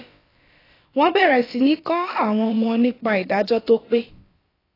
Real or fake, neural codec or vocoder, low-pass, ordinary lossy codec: fake; codec, 16 kHz, about 1 kbps, DyCAST, with the encoder's durations; 5.4 kHz; AAC, 24 kbps